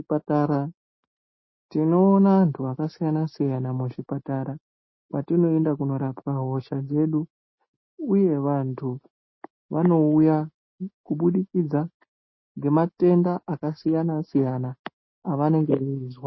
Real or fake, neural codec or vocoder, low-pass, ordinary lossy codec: real; none; 7.2 kHz; MP3, 24 kbps